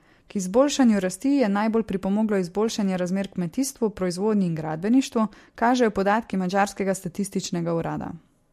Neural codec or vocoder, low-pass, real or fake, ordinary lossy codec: none; 14.4 kHz; real; MP3, 64 kbps